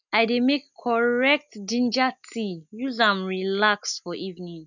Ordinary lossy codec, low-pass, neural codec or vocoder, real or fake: none; 7.2 kHz; none; real